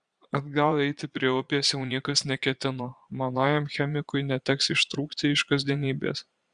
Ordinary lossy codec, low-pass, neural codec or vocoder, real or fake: Opus, 64 kbps; 9.9 kHz; vocoder, 22.05 kHz, 80 mel bands, Vocos; fake